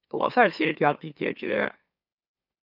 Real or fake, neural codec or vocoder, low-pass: fake; autoencoder, 44.1 kHz, a latent of 192 numbers a frame, MeloTTS; 5.4 kHz